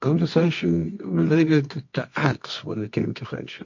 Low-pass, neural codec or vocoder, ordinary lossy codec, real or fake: 7.2 kHz; codec, 24 kHz, 0.9 kbps, WavTokenizer, medium music audio release; MP3, 48 kbps; fake